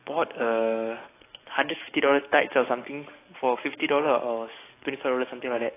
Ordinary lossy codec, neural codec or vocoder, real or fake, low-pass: AAC, 24 kbps; codec, 44.1 kHz, 7.8 kbps, DAC; fake; 3.6 kHz